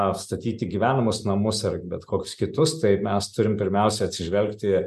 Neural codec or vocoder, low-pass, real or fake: none; 14.4 kHz; real